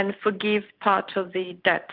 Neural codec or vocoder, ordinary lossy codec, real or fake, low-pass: none; Opus, 24 kbps; real; 5.4 kHz